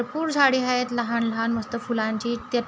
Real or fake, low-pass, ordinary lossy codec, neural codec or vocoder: real; none; none; none